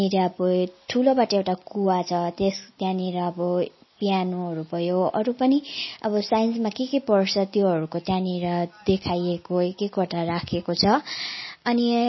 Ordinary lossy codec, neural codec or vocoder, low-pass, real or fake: MP3, 24 kbps; none; 7.2 kHz; real